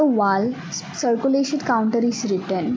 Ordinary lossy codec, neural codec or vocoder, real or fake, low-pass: none; none; real; none